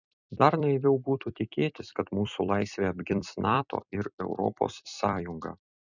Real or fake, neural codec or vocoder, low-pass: fake; vocoder, 44.1 kHz, 128 mel bands every 256 samples, BigVGAN v2; 7.2 kHz